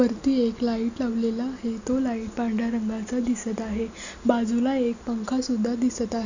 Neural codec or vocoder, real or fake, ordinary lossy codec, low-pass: none; real; none; 7.2 kHz